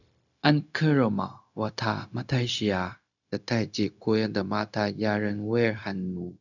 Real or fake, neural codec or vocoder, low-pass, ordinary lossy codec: fake; codec, 16 kHz, 0.4 kbps, LongCat-Audio-Codec; 7.2 kHz; none